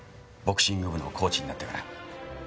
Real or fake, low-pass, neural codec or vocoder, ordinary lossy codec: real; none; none; none